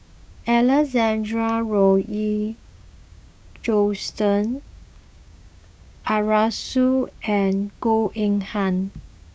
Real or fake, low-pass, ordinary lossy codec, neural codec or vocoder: fake; none; none; codec, 16 kHz, 6 kbps, DAC